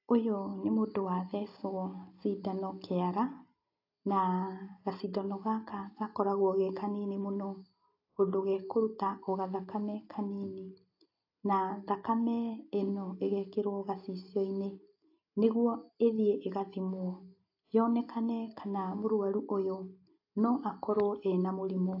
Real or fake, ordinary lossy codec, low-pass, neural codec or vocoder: real; AAC, 32 kbps; 5.4 kHz; none